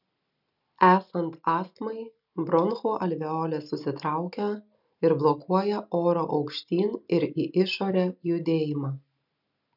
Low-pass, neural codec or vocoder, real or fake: 5.4 kHz; none; real